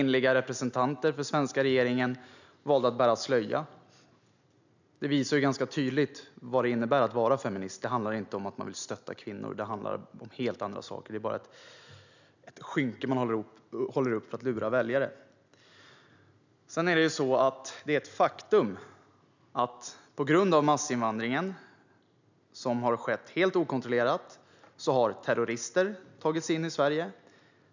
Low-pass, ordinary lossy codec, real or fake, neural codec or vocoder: 7.2 kHz; none; real; none